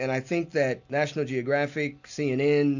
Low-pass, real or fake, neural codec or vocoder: 7.2 kHz; real; none